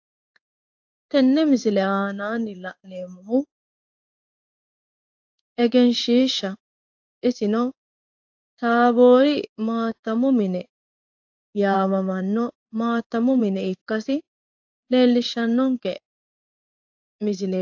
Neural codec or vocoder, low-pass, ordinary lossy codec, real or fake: codec, 16 kHz in and 24 kHz out, 1 kbps, XY-Tokenizer; 7.2 kHz; AAC, 48 kbps; fake